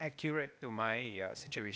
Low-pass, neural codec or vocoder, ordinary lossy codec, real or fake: none; codec, 16 kHz, 0.8 kbps, ZipCodec; none; fake